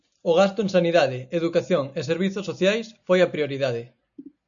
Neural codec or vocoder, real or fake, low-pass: none; real; 7.2 kHz